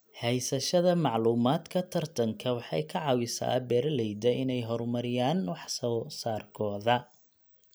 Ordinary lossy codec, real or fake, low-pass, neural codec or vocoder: none; real; none; none